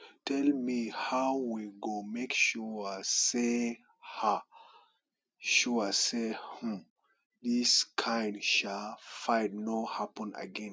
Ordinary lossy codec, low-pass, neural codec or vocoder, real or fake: none; none; none; real